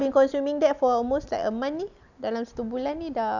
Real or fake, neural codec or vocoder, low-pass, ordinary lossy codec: real; none; 7.2 kHz; none